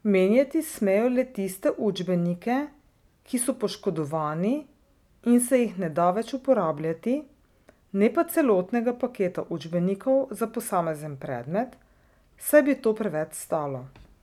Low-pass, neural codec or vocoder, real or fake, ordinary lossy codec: 19.8 kHz; none; real; none